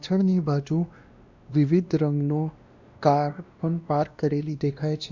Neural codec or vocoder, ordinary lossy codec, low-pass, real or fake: codec, 16 kHz, 1 kbps, X-Codec, WavLM features, trained on Multilingual LibriSpeech; Opus, 64 kbps; 7.2 kHz; fake